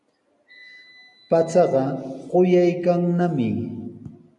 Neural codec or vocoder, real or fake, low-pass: none; real; 10.8 kHz